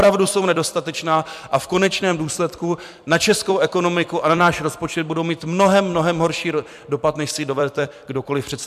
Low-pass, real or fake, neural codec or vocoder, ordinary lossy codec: 14.4 kHz; fake; vocoder, 44.1 kHz, 128 mel bands every 256 samples, BigVGAN v2; MP3, 96 kbps